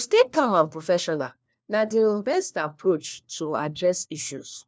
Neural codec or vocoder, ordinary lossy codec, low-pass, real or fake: codec, 16 kHz, 1 kbps, FunCodec, trained on LibriTTS, 50 frames a second; none; none; fake